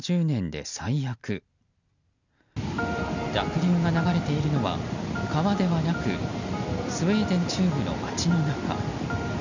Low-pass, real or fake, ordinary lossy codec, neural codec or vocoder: 7.2 kHz; real; none; none